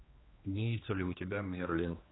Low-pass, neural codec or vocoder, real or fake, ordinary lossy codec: 7.2 kHz; codec, 16 kHz, 2 kbps, X-Codec, HuBERT features, trained on general audio; fake; AAC, 16 kbps